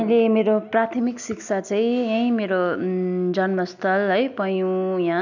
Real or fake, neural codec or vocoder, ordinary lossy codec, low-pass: real; none; none; 7.2 kHz